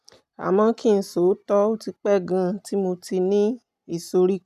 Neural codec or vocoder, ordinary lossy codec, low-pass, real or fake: none; none; 14.4 kHz; real